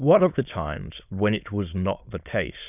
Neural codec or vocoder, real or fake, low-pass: autoencoder, 22.05 kHz, a latent of 192 numbers a frame, VITS, trained on many speakers; fake; 3.6 kHz